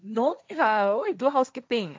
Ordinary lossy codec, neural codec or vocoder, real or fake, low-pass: none; codec, 16 kHz, 1.1 kbps, Voila-Tokenizer; fake; none